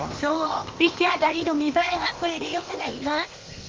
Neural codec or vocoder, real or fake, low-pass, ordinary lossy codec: codec, 16 kHz, 0.8 kbps, ZipCodec; fake; 7.2 kHz; Opus, 32 kbps